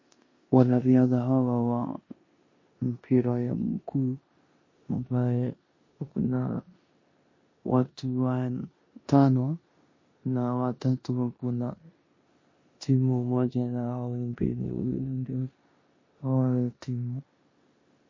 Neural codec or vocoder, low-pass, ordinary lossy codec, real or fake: codec, 16 kHz in and 24 kHz out, 0.9 kbps, LongCat-Audio-Codec, four codebook decoder; 7.2 kHz; MP3, 32 kbps; fake